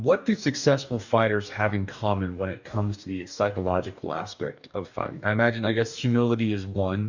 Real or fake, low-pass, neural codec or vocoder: fake; 7.2 kHz; codec, 44.1 kHz, 2.6 kbps, DAC